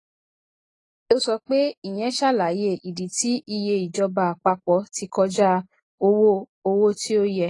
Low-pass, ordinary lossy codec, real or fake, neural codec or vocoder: 10.8 kHz; AAC, 32 kbps; real; none